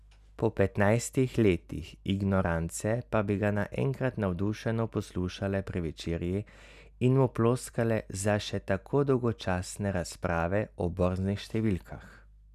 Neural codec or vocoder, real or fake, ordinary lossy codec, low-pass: none; real; none; 14.4 kHz